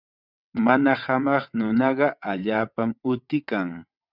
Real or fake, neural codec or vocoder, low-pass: fake; vocoder, 22.05 kHz, 80 mel bands, WaveNeXt; 5.4 kHz